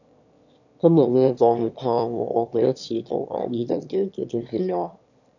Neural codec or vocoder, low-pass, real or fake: autoencoder, 22.05 kHz, a latent of 192 numbers a frame, VITS, trained on one speaker; 7.2 kHz; fake